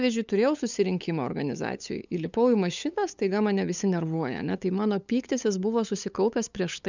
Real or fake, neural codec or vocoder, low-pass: fake; codec, 16 kHz, 8 kbps, FunCodec, trained on LibriTTS, 25 frames a second; 7.2 kHz